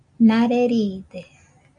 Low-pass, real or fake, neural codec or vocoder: 9.9 kHz; real; none